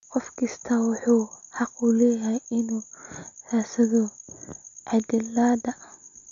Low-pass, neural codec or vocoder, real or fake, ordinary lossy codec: 7.2 kHz; none; real; none